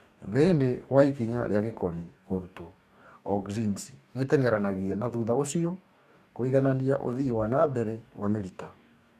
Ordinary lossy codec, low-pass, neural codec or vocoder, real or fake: none; 14.4 kHz; codec, 44.1 kHz, 2.6 kbps, DAC; fake